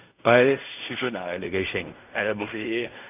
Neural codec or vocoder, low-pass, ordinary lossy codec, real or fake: codec, 16 kHz in and 24 kHz out, 0.4 kbps, LongCat-Audio-Codec, fine tuned four codebook decoder; 3.6 kHz; none; fake